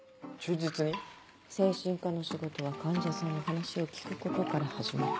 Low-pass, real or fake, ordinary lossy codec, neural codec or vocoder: none; real; none; none